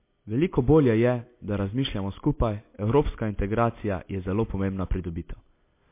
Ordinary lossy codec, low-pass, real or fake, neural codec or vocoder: MP3, 24 kbps; 3.6 kHz; real; none